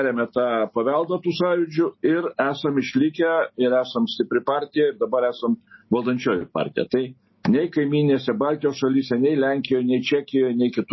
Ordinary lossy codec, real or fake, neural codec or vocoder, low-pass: MP3, 24 kbps; real; none; 7.2 kHz